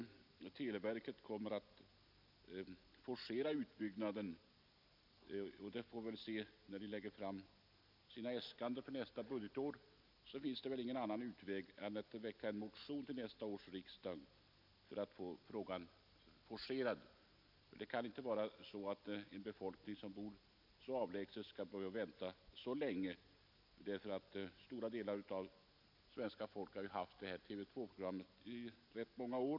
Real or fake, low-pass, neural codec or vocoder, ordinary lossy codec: real; 5.4 kHz; none; none